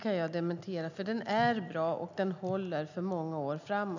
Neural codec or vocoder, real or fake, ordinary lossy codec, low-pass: none; real; none; 7.2 kHz